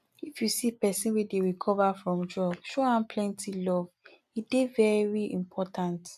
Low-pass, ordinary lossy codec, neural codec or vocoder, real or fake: 14.4 kHz; none; none; real